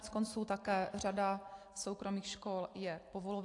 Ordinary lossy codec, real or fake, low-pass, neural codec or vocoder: AAC, 48 kbps; real; 10.8 kHz; none